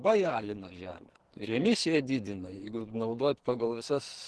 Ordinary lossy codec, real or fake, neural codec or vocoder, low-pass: Opus, 16 kbps; fake; codec, 44.1 kHz, 2.6 kbps, SNAC; 10.8 kHz